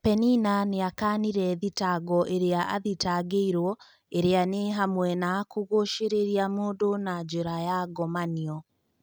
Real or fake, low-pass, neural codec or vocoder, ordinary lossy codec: real; none; none; none